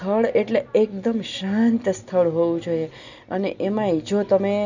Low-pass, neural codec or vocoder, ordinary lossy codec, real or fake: 7.2 kHz; none; none; real